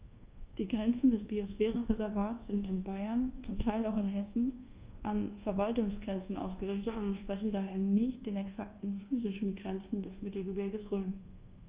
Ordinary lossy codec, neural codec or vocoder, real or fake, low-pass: Opus, 32 kbps; codec, 24 kHz, 1.2 kbps, DualCodec; fake; 3.6 kHz